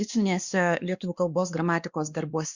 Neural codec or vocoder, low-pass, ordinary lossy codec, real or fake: codec, 16 kHz, 2 kbps, X-Codec, WavLM features, trained on Multilingual LibriSpeech; 7.2 kHz; Opus, 64 kbps; fake